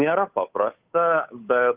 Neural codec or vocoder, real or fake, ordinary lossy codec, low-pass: codec, 16 kHz, 8 kbps, FunCodec, trained on Chinese and English, 25 frames a second; fake; Opus, 32 kbps; 3.6 kHz